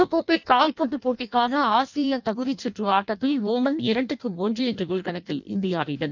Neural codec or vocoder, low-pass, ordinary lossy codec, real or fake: codec, 16 kHz in and 24 kHz out, 0.6 kbps, FireRedTTS-2 codec; 7.2 kHz; none; fake